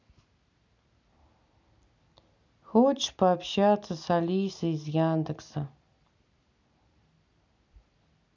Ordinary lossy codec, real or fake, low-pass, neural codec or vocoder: none; real; 7.2 kHz; none